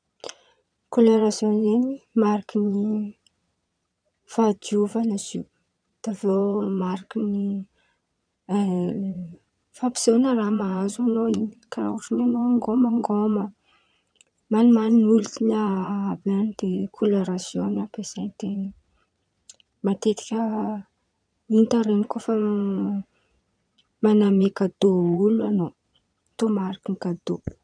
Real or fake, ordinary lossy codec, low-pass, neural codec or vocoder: fake; none; 9.9 kHz; vocoder, 22.05 kHz, 80 mel bands, Vocos